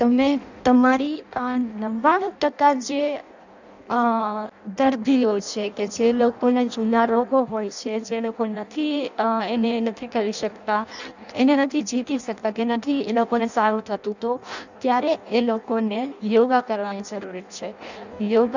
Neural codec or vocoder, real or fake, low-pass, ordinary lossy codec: codec, 16 kHz in and 24 kHz out, 0.6 kbps, FireRedTTS-2 codec; fake; 7.2 kHz; none